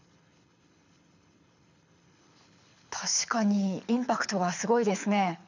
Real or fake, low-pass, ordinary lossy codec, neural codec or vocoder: fake; 7.2 kHz; none; codec, 24 kHz, 6 kbps, HILCodec